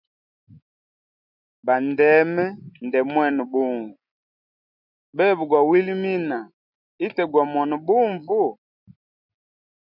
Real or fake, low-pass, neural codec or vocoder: real; 5.4 kHz; none